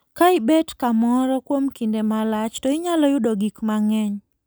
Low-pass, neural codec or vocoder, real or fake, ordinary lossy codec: none; none; real; none